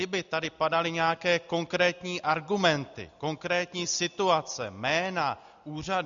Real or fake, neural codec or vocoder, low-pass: real; none; 7.2 kHz